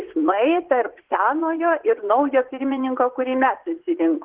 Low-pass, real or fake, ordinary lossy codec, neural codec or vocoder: 3.6 kHz; fake; Opus, 16 kbps; vocoder, 44.1 kHz, 80 mel bands, Vocos